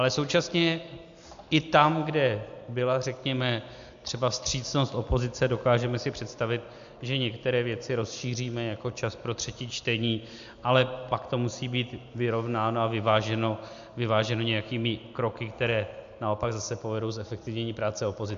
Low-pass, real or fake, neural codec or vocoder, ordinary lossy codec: 7.2 kHz; real; none; MP3, 64 kbps